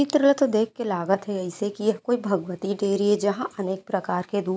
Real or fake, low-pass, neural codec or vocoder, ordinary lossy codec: real; none; none; none